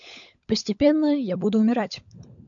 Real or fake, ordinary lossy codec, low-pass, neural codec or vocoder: fake; AAC, 64 kbps; 7.2 kHz; codec, 16 kHz, 16 kbps, FunCodec, trained on LibriTTS, 50 frames a second